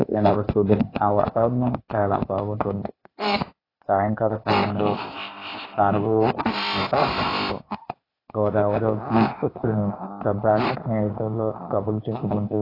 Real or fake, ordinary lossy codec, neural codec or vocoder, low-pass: fake; AAC, 24 kbps; codec, 16 kHz, 0.8 kbps, ZipCodec; 5.4 kHz